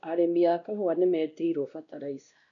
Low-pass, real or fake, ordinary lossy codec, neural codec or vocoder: 7.2 kHz; fake; none; codec, 16 kHz, 1 kbps, X-Codec, WavLM features, trained on Multilingual LibriSpeech